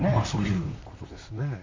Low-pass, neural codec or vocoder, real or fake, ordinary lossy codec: 7.2 kHz; vocoder, 44.1 kHz, 128 mel bands, Pupu-Vocoder; fake; MP3, 64 kbps